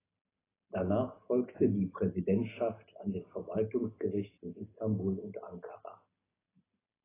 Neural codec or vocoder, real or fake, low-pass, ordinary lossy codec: none; real; 3.6 kHz; AAC, 16 kbps